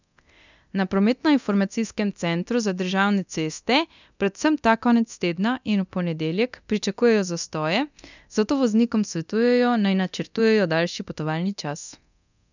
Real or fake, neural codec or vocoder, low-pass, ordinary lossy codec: fake; codec, 24 kHz, 0.9 kbps, DualCodec; 7.2 kHz; none